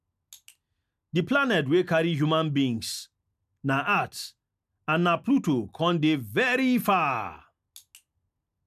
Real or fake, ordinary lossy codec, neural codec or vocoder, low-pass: real; none; none; 14.4 kHz